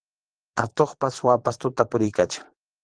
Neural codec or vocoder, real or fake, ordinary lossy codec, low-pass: vocoder, 22.05 kHz, 80 mel bands, Vocos; fake; Opus, 16 kbps; 9.9 kHz